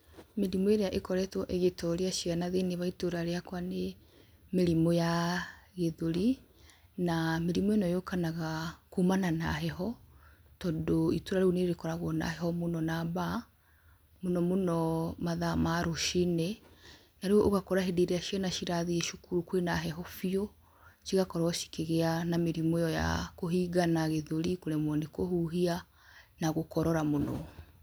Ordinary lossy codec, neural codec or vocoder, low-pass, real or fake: none; none; none; real